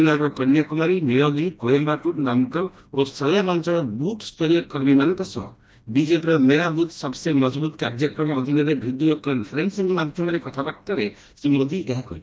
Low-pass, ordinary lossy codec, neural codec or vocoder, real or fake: none; none; codec, 16 kHz, 1 kbps, FreqCodec, smaller model; fake